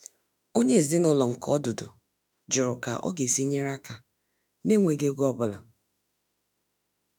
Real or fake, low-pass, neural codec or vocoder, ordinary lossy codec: fake; none; autoencoder, 48 kHz, 32 numbers a frame, DAC-VAE, trained on Japanese speech; none